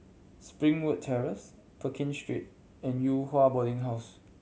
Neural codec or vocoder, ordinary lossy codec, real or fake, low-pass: none; none; real; none